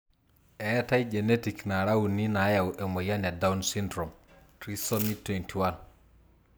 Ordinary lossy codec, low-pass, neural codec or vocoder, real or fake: none; none; none; real